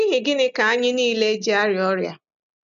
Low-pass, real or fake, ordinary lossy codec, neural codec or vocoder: 7.2 kHz; real; none; none